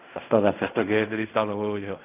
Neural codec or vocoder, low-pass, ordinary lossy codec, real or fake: codec, 16 kHz in and 24 kHz out, 0.4 kbps, LongCat-Audio-Codec, fine tuned four codebook decoder; 3.6 kHz; none; fake